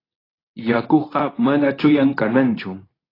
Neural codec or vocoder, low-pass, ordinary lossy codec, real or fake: codec, 24 kHz, 0.9 kbps, WavTokenizer, medium speech release version 2; 5.4 kHz; AAC, 24 kbps; fake